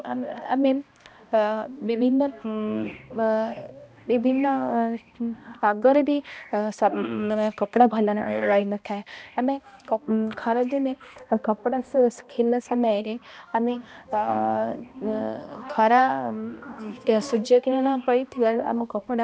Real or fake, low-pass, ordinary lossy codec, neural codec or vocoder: fake; none; none; codec, 16 kHz, 1 kbps, X-Codec, HuBERT features, trained on balanced general audio